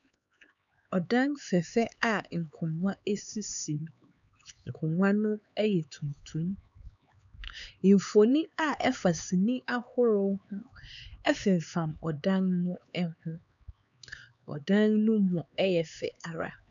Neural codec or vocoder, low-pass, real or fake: codec, 16 kHz, 4 kbps, X-Codec, HuBERT features, trained on LibriSpeech; 7.2 kHz; fake